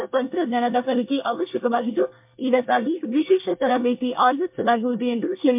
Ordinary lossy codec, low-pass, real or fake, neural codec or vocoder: MP3, 32 kbps; 3.6 kHz; fake; codec, 24 kHz, 1 kbps, SNAC